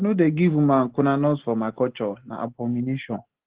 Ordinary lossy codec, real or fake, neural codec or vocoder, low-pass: Opus, 16 kbps; real; none; 3.6 kHz